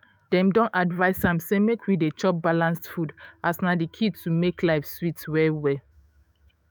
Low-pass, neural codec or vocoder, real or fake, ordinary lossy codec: none; autoencoder, 48 kHz, 128 numbers a frame, DAC-VAE, trained on Japanese speech; fake; none